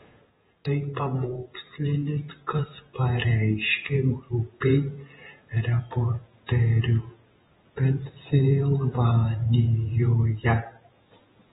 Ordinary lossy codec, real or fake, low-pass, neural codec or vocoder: AAC, 16 kbps; fake; 19.8 kHz; vocoder, 48 kHz, 128 mel bands, Vocos